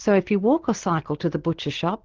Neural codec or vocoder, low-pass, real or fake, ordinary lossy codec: none; 7.2 kHz; real; Opus, 16 kbps